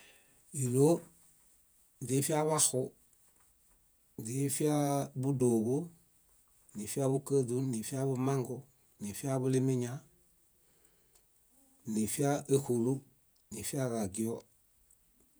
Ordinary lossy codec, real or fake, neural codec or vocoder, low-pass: none; fake; vocoder, 48 kHz, 128 mel bands, Vocos; none